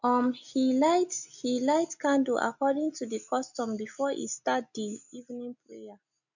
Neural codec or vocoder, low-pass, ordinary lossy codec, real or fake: none; 7.2 kHz; none; real